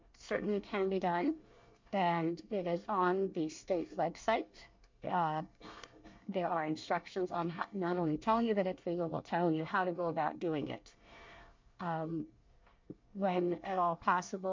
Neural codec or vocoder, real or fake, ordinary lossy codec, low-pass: codec, 24 kHz, 1 kbps, SNAC; fake; MP3, 48 kbps; 7.2 kHz